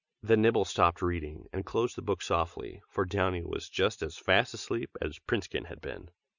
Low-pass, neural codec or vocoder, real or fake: 7.2 kHz; none; real